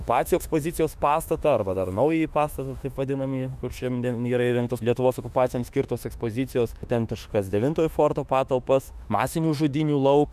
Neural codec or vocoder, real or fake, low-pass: autoencoder, 48 kHz, 32 numbers a frame, DAC-VAE, trained on Japanese speech; fake; 14.4 kHz